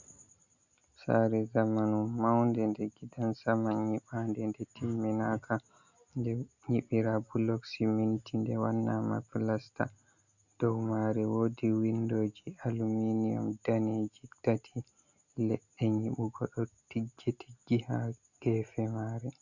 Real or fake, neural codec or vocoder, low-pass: real; none; 7.2 kHz